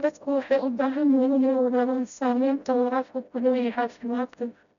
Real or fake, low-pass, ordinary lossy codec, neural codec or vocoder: fake; 7.2 kHz; none; codec, 16 kHz, 0.5 kbps, FreqCodec, smaller model